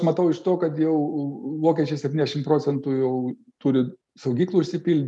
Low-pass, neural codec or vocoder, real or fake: 10.8 kHz; none; real